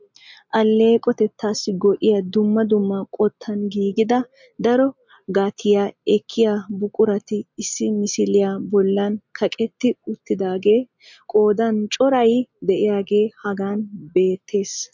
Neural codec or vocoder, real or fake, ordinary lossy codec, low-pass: none; real; MP3, 64 kbps; 7.2 kHz